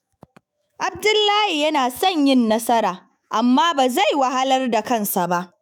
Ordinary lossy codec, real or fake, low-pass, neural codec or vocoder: none; fake; none; autoencoder, 48 kHz, 128 numbers a frame, DAC-VAE, trained on Japanese speech